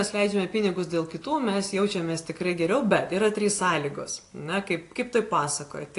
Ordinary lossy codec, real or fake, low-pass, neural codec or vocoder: AAC, 48 kbps; real; 10.8 kHz; none